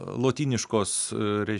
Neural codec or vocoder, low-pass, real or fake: none; 10.8 kHz; real